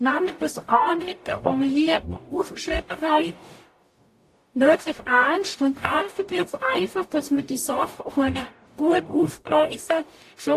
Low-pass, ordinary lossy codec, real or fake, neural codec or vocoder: 14.4 kHz; AAC, 64 kbps; fake; codec, 44.1 kHz, 0.9 kbps, DAC